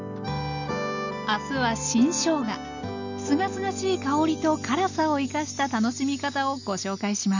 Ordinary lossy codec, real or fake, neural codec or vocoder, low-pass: none; real; none; 7.2 kHz